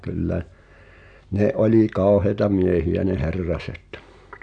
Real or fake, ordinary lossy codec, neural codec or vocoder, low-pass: real; none; none; 9.9 kHz